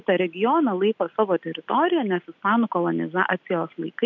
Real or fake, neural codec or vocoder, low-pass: real; none; 7.2 kHz